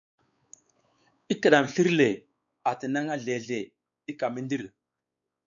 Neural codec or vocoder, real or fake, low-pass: codec, 16 kHz, 4 kbps, X-Codec, WavLM features, trained on Multilingual LibriSpeech; fake; 7.2 kHz